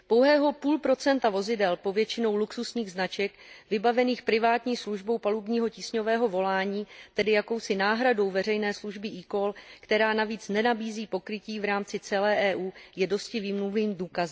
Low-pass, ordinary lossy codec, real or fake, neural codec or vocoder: none; none; real; none